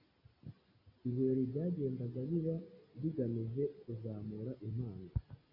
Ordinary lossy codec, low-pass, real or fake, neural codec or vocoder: AAC, 32 kbps; 5.4 kHz; real; none